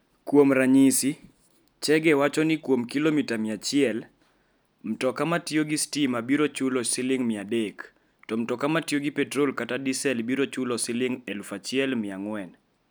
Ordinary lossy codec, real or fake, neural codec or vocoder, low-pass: none; real; none; none